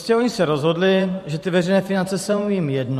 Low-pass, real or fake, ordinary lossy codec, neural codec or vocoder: 14.4 kHz; fake; MP3, 64 kbps; vocoder, 44.1 kHz, 128 mel bands every 512 samples, BigVGAN v2